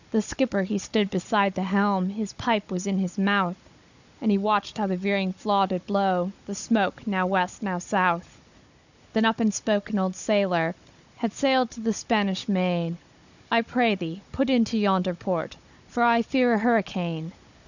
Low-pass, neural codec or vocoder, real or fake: 7.2 kHz; codec, 16 kHz, 16 kbps, FunCodec, trained on Chinese and English, 50 frames a second; fake